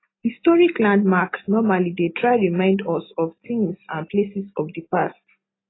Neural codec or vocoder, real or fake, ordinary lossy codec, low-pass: none; real; AAC, 16 kbps; 7.2 kHz